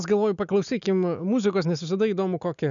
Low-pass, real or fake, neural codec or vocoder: 7.2 kHz; real; none